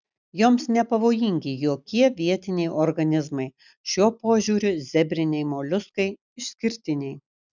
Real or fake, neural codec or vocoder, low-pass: real; none; 7.2 kHz